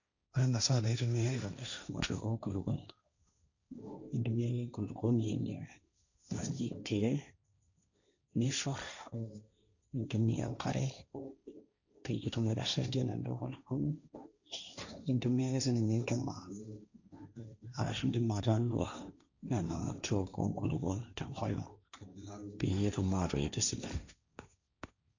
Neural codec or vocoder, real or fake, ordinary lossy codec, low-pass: codec, 16 kHz, 1.1 kbps, Voila-Tokenizer; fake; none; 7.2 kHz